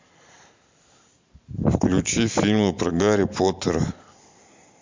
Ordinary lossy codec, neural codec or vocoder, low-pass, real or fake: MP3, 64 kbps; vocoder, 44.1 kHz, 128 mel bands every 512 samples, BigVGAN v2; 7.2 kHz; fake